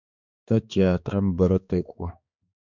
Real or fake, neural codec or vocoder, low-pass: fake; codec, 16 kHz, 2 kbps, X-Codec, HuBERT features, trained on balanced general audio; 7.2 kHz